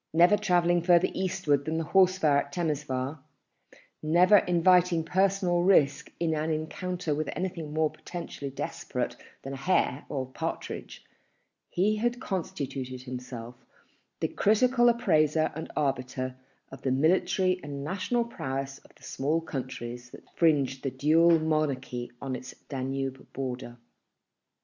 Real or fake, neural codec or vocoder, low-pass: real; none; 7.2 kHz